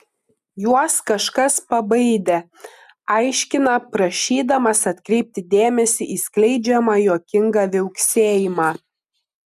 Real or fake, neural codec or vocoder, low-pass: real; none; 14.4 kHz